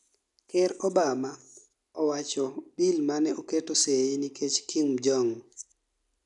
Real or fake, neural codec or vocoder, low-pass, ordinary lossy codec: real; none; 10.8 kHz; none